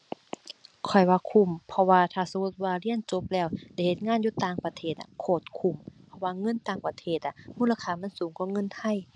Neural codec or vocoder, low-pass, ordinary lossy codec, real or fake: none; 9.9 kHz; none; real